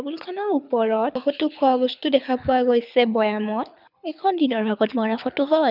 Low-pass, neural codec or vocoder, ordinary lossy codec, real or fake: 5.4 kHz; codec, 24 kHz, 6 kbps, HILCodec; none; fake